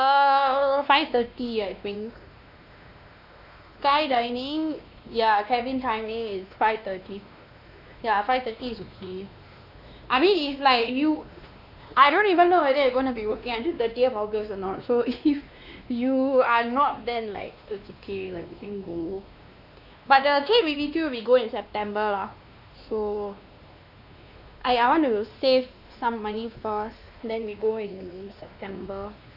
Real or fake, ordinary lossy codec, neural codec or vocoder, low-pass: fake; none; codec, 16 kHz, 2 kbps, X-Codec, WavLM features, trained on Multilingual LibriSpeech; 5.4 kHz